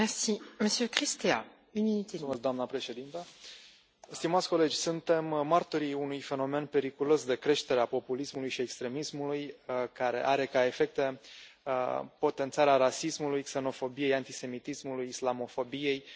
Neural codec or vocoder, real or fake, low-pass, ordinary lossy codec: none; real; none; none